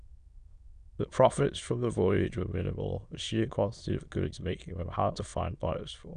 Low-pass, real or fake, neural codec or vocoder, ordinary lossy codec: 9.9 kHz; fake; autoencoder, 22.05 kHz, a latent of 192 numbers a frame, VITS, trained on many speakers; none